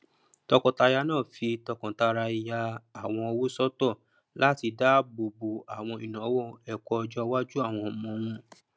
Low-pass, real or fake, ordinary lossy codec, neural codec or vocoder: none; real; none; none